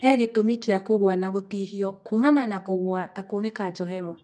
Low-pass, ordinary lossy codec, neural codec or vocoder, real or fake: none; none; codec, 24 kHz, 0.9 kbps, WavTokenizer, medium music audio release; fake